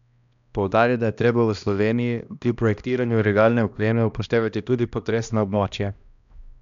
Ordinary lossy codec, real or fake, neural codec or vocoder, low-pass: none; fake; codec, 16 kHz, 1 kbps, X-Codec, HuBERT features, trained on balanced general audio; 7.2 kHz